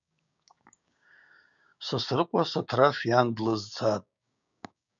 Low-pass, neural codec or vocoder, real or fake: 7.2 kHz; codec, 16 kHz, 6 kbps, DAC; fake